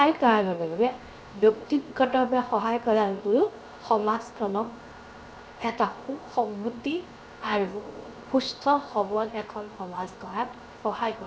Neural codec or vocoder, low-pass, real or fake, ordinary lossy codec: codec, 16 kHz, 0.7 kbps, FocalCodec; none; fake; none